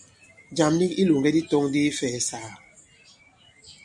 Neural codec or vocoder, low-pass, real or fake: none; 10.8 kHz; real